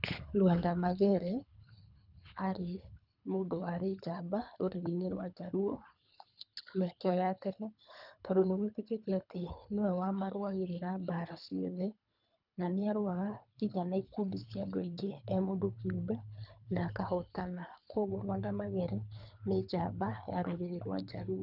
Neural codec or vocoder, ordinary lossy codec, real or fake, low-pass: codec, 24 kHz, 3 kbps, HILCodec; none; fake; 5.4 kHz